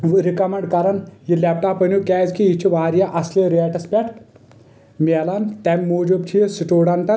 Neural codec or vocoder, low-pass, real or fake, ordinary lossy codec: none; none; real; none